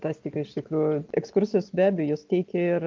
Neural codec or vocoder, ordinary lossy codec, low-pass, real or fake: none; Opus, 24 kbps; 7.2 kHz; real